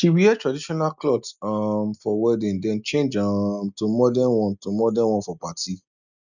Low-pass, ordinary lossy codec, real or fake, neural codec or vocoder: 7.2 kHz; none; real; none